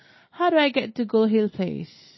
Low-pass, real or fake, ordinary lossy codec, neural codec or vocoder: 7.2 kHz; real; MP3, 24 kbps; none